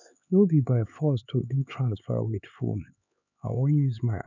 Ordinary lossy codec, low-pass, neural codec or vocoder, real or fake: none; 7.2 kHz; codec, 16 kHz, 4 kbps, X-Codec, HuBERT features, trained on LibriSpeech; fake